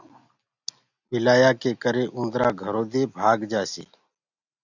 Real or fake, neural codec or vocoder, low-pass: real; none; 7.2 kHz